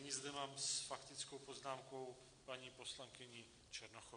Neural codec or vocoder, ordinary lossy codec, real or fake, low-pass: none; AAC, 48 kbps; real; 9.9 kHz